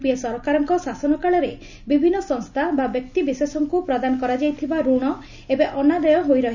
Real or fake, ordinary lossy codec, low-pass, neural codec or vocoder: real; none; 7.2 kHz; none